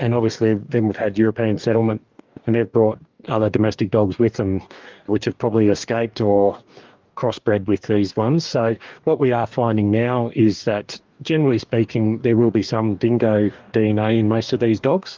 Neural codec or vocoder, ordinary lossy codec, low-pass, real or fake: codec, 44.1 kHz, 2.6 kbps, DAC; Opus, 32 kbps; 7.2 kHz; fake